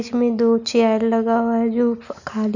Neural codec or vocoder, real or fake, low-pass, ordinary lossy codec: none; real; 7.2 kHz; none